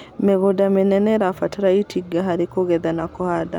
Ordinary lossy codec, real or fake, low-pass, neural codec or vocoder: none; real; 19.8 kHz; none